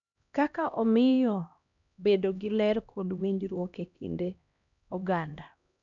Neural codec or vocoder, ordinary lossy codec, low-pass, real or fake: codec, 16 kHz, 1 kbps, X-Codec, HuBERT features, trained on LibriSpeech; none; 7.2 kHz; fake